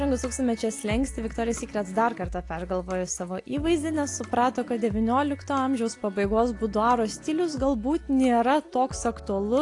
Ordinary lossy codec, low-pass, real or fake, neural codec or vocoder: AAC, 48 kbps; 10.8 kHz; real; none